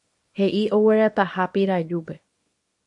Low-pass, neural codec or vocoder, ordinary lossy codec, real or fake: 10.8 kHz; codec, 24 kHz, 0.9 kbps, WavTokenizer, small release; MP3, 48 kbps; fake